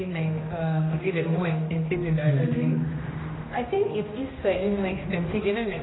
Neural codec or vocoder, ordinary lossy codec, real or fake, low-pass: codec, 16 kHz, 1 kbps, X-Codec, HuBERT features, trained on general audio; AAC, 16 kbps; fake; 7.2 kHz